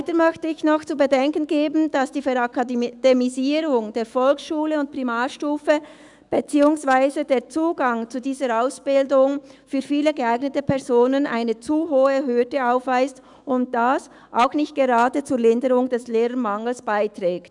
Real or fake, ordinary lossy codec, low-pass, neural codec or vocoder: fake; none; 10.8 kHz; autoencoder, 48 kHz, 128 numbers a frame, DAC-VAE, trained on Japanese speech